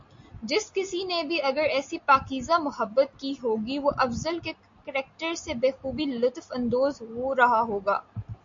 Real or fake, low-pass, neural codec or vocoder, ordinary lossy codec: real; 7.2 kHz; none; MP3, 48 kbps